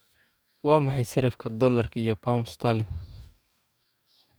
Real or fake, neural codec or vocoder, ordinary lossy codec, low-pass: fake; codec, 44.1 kHz, 2.6 kbps, DAC; none; none